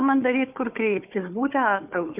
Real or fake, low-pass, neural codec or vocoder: fake; 3.6 kHz; codec, 16 kHz, 4 kbps, FunCodec, trained on Chinese and English, 50 frames a second